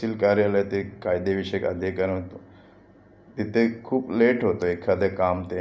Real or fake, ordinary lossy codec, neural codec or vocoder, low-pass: real; none; none; none